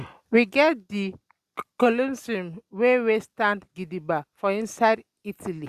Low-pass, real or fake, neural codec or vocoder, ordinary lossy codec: 14.4 kHz; real; none; Opus, 64 kbps